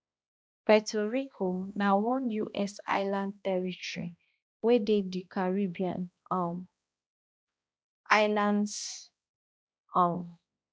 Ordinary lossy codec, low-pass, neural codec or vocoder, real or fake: none; none; codec, 16 kHz, 1 kbps, X-Codec, HuBERT features, trained on balanced general audio; fake